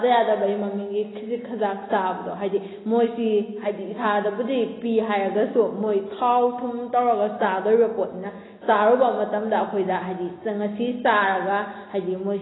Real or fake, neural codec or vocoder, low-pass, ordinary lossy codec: real; none; 7.2 kHz; AAC, 16 kbps